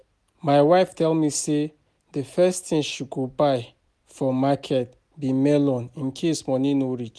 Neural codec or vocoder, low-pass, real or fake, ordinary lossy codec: none; none; real; none